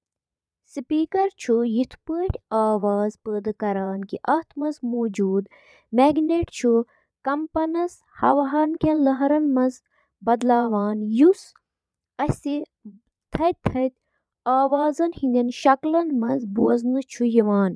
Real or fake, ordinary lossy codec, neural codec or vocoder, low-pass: fake; none; vocoder, 22.05 kHz, 80 mel bands, Vocos; none